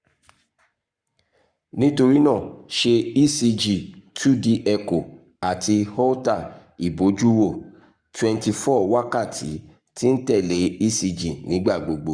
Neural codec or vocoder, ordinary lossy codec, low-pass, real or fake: codec, 44.1 kHz, 7.8 kbps, Pupu-Codec; Opus, 64 kbps; 9.9 kHz; fake